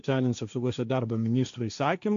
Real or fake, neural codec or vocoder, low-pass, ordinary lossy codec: fake; codec, 16 kHz, 1.1 kbps, Voila-Tokenizer; 7.2 kHz; AAC, 64 kbps